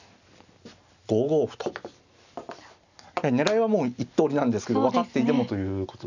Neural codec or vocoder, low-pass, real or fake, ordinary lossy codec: none; 7.2 kHz; real; none